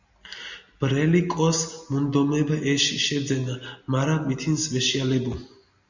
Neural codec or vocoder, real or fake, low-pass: none; real; 7.2 kHz